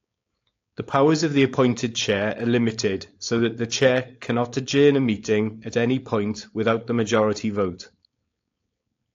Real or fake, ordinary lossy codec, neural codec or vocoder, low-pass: fake; AAC, 48 kbps; codec, 16 kHz, 4.8 kbps, FACodec; 7.2 kHz